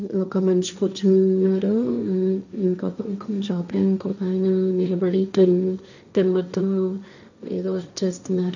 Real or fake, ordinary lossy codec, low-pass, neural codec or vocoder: fake; none; 7.2 kHz; codec, 16 kHz, 1.1 kbps, Voila-Tokenizer